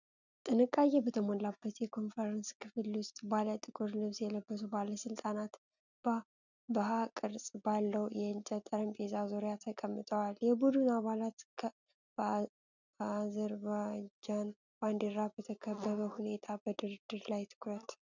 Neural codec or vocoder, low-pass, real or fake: none; 7.2 kHz; real